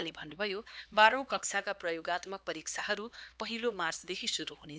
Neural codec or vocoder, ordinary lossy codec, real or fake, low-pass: codec, 16 kHz, 2 kbps, X-Codec, HuBERT features, trained on LibriSpeech; none; fake; none